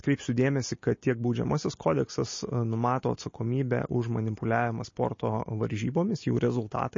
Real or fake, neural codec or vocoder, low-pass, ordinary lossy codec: real; none; 7.2 kHz; MP3, 32 kbps